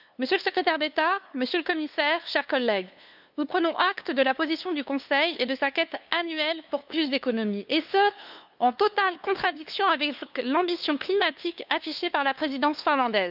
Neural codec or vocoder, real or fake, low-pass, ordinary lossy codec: codec, 16 kHz, 2 kbps, FunCodec, trained on LibriTTS, 25 frames a second; fake; 5.4 kHz; none